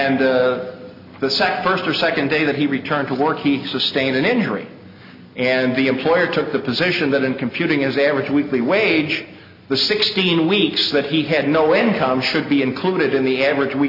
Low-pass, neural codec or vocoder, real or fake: 5.4 kHz; none; real